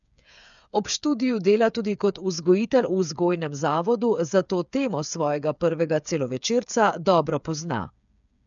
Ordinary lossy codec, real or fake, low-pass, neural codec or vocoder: none; fake; 7.2 kHz; codec, 16 kHz, 8 kbps, FreqCodec, smaller model